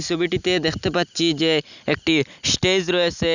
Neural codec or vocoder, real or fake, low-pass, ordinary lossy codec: none; real; 7.2 kHz; none